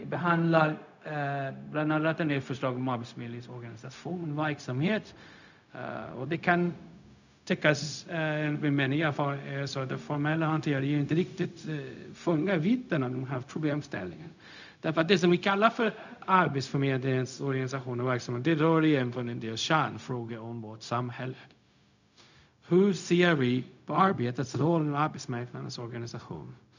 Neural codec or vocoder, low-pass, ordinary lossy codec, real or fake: codec, 16 kHz, 0.4 kbps, LongCat-Audio-Codec; 7.2 kHz; none; fake